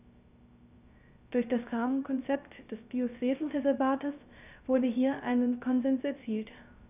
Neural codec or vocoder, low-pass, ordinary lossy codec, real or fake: codec, 16 kHz, 0.3 kbps, FocalCodec; 3.6 kHz; none; fake